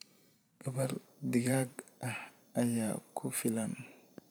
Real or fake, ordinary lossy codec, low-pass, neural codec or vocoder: fake; none; none; vocoder, 44.1 kHz, 128 mel bands every 512 samples, BigVGAN v2